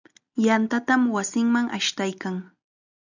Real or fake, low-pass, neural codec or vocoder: real; 7.2 kHz; none